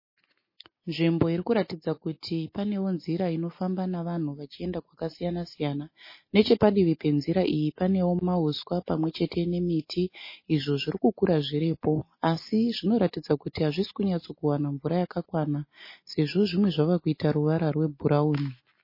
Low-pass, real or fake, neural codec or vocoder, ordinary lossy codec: 5.4 kHz; real; none; MP3, 24 kbps